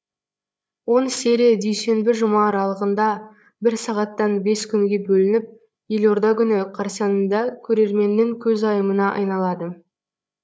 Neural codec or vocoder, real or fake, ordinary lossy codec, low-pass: codec, 16 kHz, 8 kbps, FreqCodec, larger model; fake; none; none